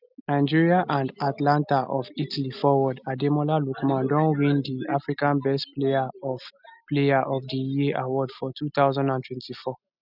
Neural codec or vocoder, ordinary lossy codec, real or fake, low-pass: none; none; real; 5.4 kHz